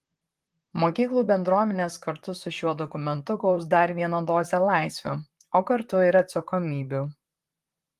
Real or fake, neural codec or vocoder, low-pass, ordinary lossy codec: fake; vocoder, 44.1 kHz, 128 mel bands, Pupu-Vocoder; 14.4 kHz; Opus, 24 kbps